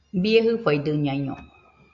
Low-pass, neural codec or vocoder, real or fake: 7.2 kHz; none; real